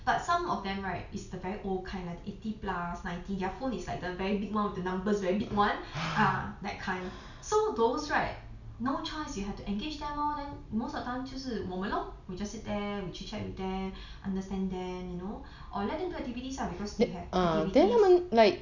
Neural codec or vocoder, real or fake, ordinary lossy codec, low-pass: none; real; none; 7.2 kHz